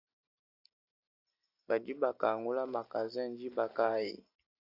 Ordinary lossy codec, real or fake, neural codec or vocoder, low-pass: AAC, 32 kbps; real; none; 5.4 kHz